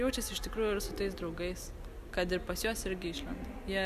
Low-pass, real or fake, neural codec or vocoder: 14.4 kHz; real; none